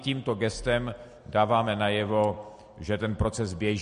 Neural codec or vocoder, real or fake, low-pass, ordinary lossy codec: none; real; 10.8 kHz; MP3, 48 kbps